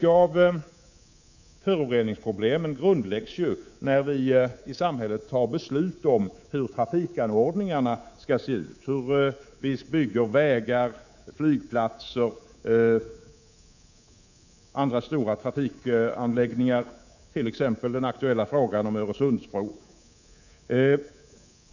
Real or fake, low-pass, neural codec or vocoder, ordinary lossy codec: fake; 7.2 kHz; codec, 24 kHz, 3.1 kbps, DualCodec; none